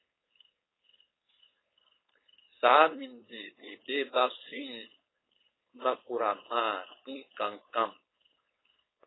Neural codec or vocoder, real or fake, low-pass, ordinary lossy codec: codec, 16 kHz, 4.8 kbps, FACodec; fake; 7.2 kHz; AAC, 16 kbps